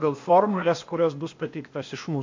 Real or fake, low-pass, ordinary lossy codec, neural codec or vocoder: fake; 7.2 kHz; MP3, 48 kbps; codec, 16 kHz, 0.8 kbps, ZipCodec